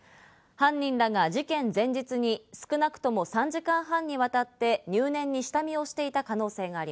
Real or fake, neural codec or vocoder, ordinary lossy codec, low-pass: real; none; none; none